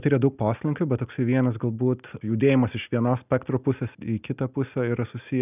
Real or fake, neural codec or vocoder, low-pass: real; none; 3.6 kHz